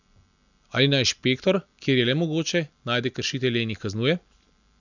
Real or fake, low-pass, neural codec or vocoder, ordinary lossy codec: real; 7.2 kHz; none; none